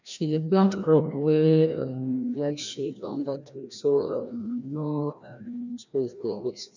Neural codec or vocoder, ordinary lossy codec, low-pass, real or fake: codec, 16 kHz, 1 kbps, FreqCodec, larger model; none; 7.2 kHz; fake